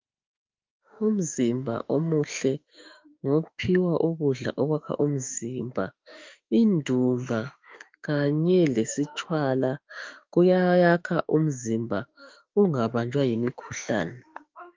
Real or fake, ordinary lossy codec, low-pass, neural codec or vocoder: fake; Opus, 32 kbps; 7.2 kHz; autoencoder, 48 kHz, 32 numbers a frame, DAC-VAE, trained on Japanese speech